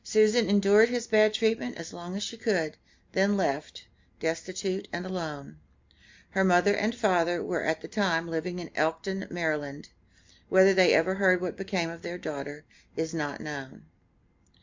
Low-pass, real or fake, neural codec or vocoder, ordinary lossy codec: 7.2 kHz; real; none; MP3, 64 kbps